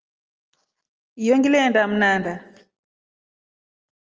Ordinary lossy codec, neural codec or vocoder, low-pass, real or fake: Opus, 24 kbps; none; 7.2 kHz; real